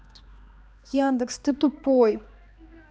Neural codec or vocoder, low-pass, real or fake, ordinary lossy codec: codec, 16 kHz, 2 kbps, X-Codec, HuBERT features, trained on balanced general audio; none; fake; none